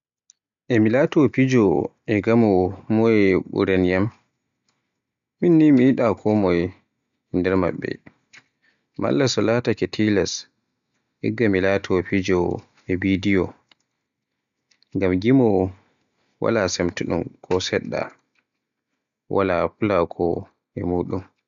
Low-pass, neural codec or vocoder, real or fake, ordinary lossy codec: 7.2 kHz; none; real; none